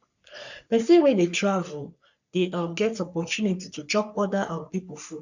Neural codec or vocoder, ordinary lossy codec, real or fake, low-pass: codec, 44.1 kHz, 3.4 kbps, Pupu-Codec; none; fake; 7.2 kHz